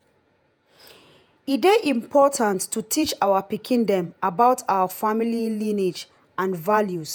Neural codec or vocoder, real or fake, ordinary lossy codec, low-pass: vocoder, 48 kHz, 128 mel bands, Vocos; fake; none; none